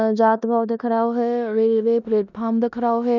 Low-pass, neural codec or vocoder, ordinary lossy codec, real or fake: 7.2 kHz; codec, 16 kHz in and 24 kHz out, 0.9 kbps, LongCat-Audio-Codec, four codebook decoder; none; fake